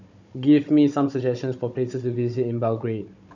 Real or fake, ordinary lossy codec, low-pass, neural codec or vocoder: fake; none; 7.2 kHz; codec, 16 kHz, 16 kbps, FunCodec, trained on Chinese and English, 50 frames a second